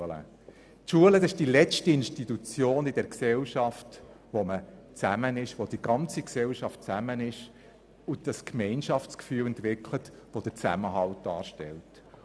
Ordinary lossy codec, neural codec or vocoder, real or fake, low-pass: none; none; real; none